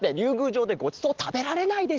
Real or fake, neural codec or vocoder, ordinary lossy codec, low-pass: real; none; Opus, 16 kbps; 7.2 kHz